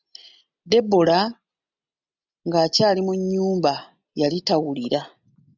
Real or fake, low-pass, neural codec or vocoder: real; 7.2 kHz; none